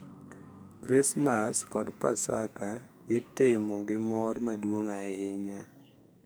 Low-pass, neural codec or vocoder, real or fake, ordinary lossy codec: none; codec, 44.1 kHz, 2.6 kbps, SNAC; fake; none